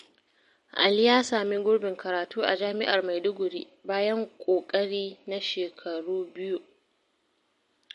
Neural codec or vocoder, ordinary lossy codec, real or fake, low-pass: none; MP3, 48 kbps; real; 14.4 kHz